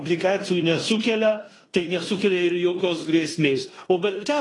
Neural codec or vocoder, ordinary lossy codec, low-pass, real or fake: codec, 16 kHz in and 24 kHz out, 0.9 kbps, LongCat-Audio-Codec, fine tuned four codebook decoder; AAC, 32 kbps; 10.8 kHz; fake